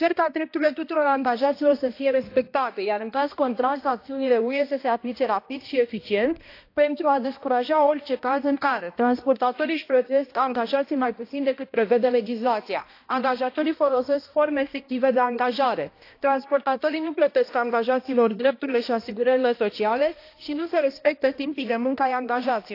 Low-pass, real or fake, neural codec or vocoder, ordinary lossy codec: 5.4 kHz; fake; codec, 16 kHz, 1 kbps, X-Codec, HuBERT features, trained on balanced general audio; AAC, 32 kbps